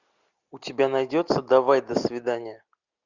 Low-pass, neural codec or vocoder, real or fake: 7.2 kHz; none; real